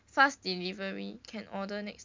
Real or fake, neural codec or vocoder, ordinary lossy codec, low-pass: real; none; MP3, 64 kbps; 7.2 kHz